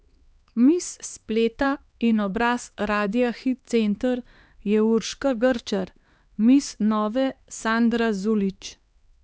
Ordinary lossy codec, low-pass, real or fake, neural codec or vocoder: none; none; fake; codec, 16 kHz, 2 kbps, X-Codec, HuBERT features, trained on LibriSpeech